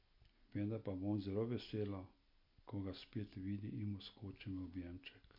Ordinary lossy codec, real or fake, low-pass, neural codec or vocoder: MP3, 32 kbps; real; 5.4 kHz; none